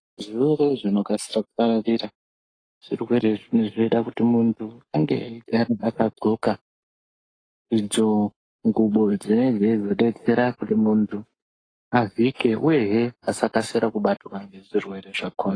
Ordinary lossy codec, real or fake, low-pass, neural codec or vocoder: AAC, 32 kbps; fake; 9.9 kHz; codec, 44.1 kHz, 7.8 kbps, Pupu-Codec